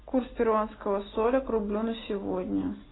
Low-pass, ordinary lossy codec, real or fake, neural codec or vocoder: 7.2 kHz; AAC, 16 kbps; real; none